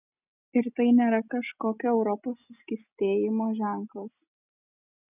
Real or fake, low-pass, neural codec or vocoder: real; 3.6 kHz; none